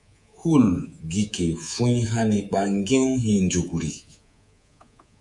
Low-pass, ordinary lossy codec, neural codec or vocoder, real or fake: 10.8 kHz; MP3, 96 kbps; codec, 24 kHz, 3.1 kbps, DualCodec; fake